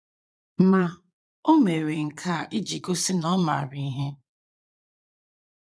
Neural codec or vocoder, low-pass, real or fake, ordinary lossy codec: vocoder, 22.05 kHz, 80 mel bands, Vocos; none; fake; none